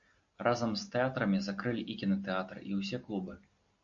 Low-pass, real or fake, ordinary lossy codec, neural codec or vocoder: 7.2 kHz; real; MP3, 64 kbps; none